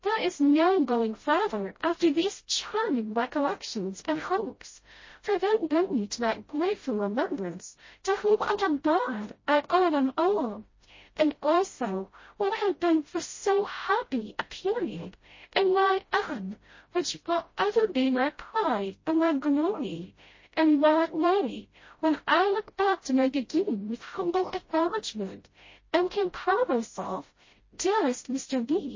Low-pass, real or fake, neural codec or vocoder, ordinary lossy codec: 7.2 kHz; fake; codec, 16 kHz, 0.5 kbps, FreqCodec, smaller model; MP3, 32 kbps